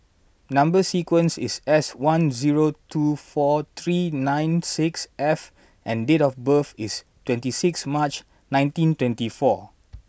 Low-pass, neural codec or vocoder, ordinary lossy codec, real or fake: none; none; none; real